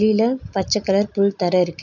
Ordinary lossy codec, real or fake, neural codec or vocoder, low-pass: none; real; none; 7.2 kHz